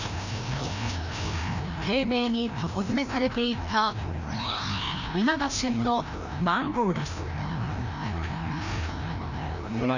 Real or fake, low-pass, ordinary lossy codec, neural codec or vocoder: fake; 7.2 kHz; none; codec, 16 kHz, 1 kbps, FreqCodec, larger model